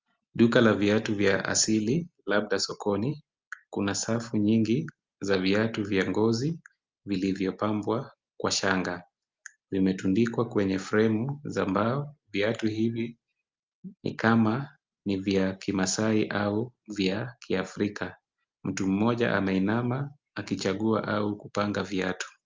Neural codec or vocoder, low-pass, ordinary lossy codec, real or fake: none; 7.2 kHz; Opus, 32 kbps; real